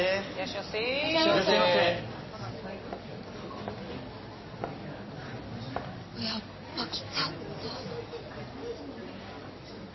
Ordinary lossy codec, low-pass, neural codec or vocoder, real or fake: MP3, 24 kbps; 7.2 kHz; none; real